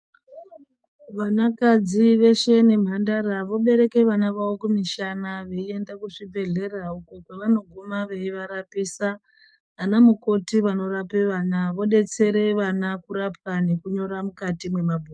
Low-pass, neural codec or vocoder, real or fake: 9.9 kHz; codec, 44.1 kHz, 7.8 kbps, DAC; fake